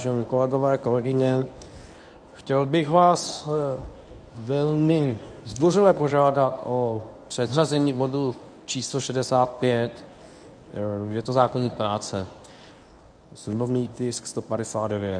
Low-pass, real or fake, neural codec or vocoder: 9.9 kHz; fake; codec, 24 kHz, 0.9 kbps, WavTokenizer, medium speech release version 1